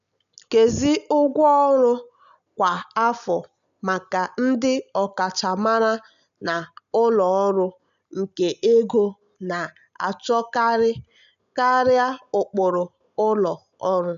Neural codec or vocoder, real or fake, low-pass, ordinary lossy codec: none; real; 7.2 kHz; none